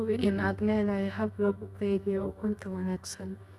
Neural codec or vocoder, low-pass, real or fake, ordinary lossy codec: codec, 24 kHz, 0.9 kbps, WavTokenizer, medium music audio release; none; fake; none